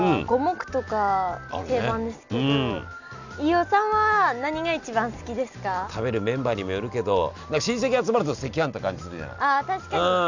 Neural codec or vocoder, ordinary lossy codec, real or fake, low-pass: none; none; real; 7.2 kHz